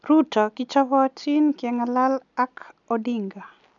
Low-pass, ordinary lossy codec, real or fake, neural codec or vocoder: 7.2 kHz; none; real; none